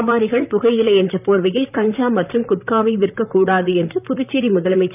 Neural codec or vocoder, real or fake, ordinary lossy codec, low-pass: vocoder, 44.1 kHz, 128 mel bands, Pupu-Vocoder; fake; none; 3.6 kHz